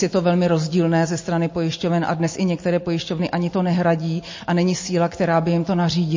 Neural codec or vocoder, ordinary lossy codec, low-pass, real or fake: none; MP3, 32 kbps; 7.2 kHz; real